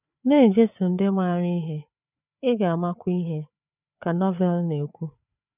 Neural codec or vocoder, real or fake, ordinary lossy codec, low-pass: codec, 16 kHz, 4 kbps, FreqCodec, larger model; fake; none; 3.6 kHz